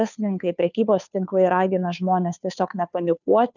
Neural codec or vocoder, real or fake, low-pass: codec, 16 kHz, 2 kbps, FunCodec, trained on Chinese and English, 25 frames a second; fake; 7.2 kHz